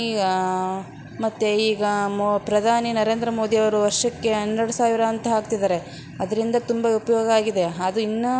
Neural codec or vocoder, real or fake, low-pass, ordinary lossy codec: none; real; none; none